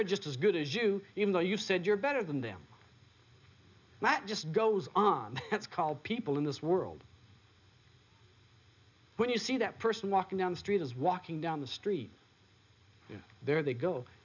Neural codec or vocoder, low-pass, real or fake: none; 7.2 kHz; real